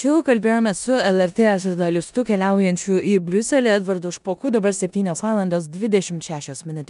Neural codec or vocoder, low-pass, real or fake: codec, 16 kHz in and 24 kHz out, 0.9 kbps, LongCat-Audio-Codec, four codebook decoder; 10.8 kHz; fake